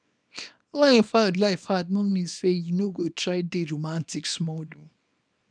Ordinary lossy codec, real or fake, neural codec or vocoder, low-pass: none; fake; codec, 24 kHz, 0.9 kbps, WavTokenizer, small release; 9.9 kHz